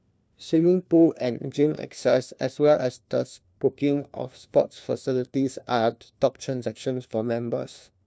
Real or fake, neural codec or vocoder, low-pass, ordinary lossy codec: fake; codec, 16 kHz, 1 kbps, FunCodec, trained on LibriTTS, 50 frames a second; none; none